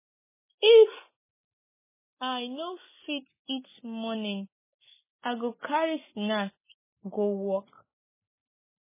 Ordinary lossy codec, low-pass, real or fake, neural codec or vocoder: MP3, 16 kbps; 3.6 kHz; real; none